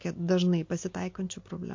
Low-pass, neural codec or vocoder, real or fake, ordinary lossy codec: 7.2 kHz; none; real; MP3, 48 kbps